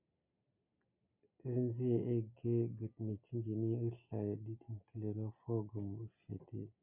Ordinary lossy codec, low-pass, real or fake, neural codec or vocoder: MP3, 32 kbps; 3.6 kHz; real; none